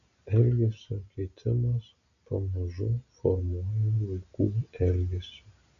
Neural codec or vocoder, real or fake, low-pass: none; real; 7.2 kHz